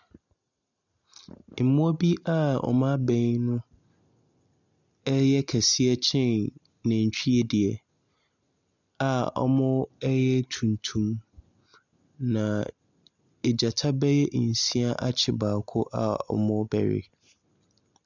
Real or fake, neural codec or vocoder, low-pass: real; none; 7.2 kHz